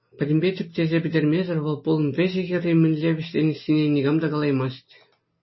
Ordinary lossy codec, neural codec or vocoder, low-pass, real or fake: MP3, 24 kbps; none; 7.2 kHz; real